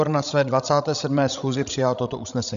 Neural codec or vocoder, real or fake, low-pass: codec, 16 kHz, 16 kbps, FreqCodec, larger model; fake; 7.2 kHz